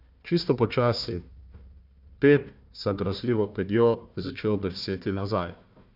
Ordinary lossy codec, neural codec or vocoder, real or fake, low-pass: none; codec, 16 kHz, 1 kbps, FunCodec, trained on Chinese and English, 50 frames a second; fake; 5.4 kHz